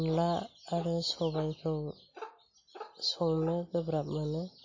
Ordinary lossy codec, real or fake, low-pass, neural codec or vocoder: MP3, 32 kbps; real; 7.2 kHz; none